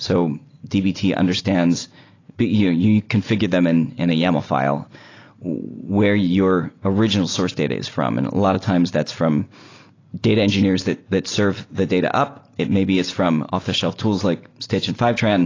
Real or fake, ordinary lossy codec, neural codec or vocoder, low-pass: real; AAC, 32 kbps; none; 7.2 kHz